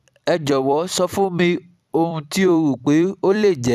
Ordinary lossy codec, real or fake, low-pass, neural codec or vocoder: none; fake; 14.4 kHz; vocoder, 44.1 kHz, 128 mel bands every 256 samples, BigVGAN v2